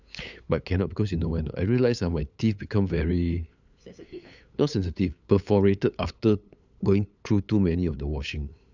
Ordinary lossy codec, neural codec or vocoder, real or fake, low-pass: none; codec, 16 kHz, 8 kbps, FunCodec, trained on LibriTTS, 25 frames a second; fake; 7.2 kHz